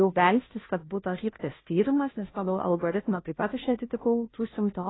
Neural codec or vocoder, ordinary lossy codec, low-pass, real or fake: codec, 16 kHz, 0.5 kbps, FunCodec, trained on Chinese and English, 25 frames a second; AAC, 16 kbps; 7.2 kHz; fake